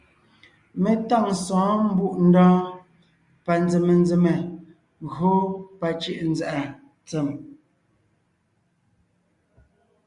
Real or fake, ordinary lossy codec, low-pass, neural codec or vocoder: real; Opus, 64 kbps; 10.8 kHz; none